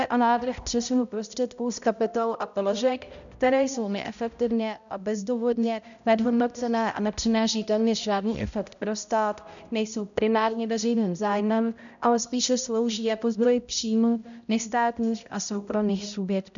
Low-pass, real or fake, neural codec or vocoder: 7.2 kHz; fake; codec, 16 kHz, 0.5 kbps, X-Codec, HuBERT features, trained on balanced general audio